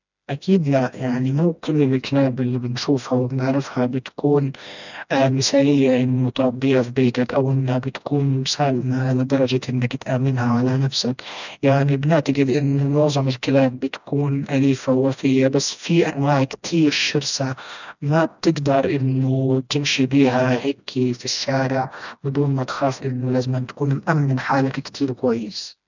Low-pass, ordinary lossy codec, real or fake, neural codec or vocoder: 7.2 kHz; none; fake; codec, 16 kHz, 1 kbps, FreqCodec, smaller model